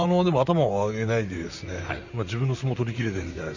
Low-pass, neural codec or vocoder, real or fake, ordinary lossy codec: 7.2 kHz; vocoder, 44.1 kHz, 128 mel bands, Pupu-Vocoder; fake; none